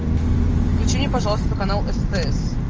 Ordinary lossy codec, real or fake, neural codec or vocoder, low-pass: Opus, 24 kbps; real; none; 7.2 kHz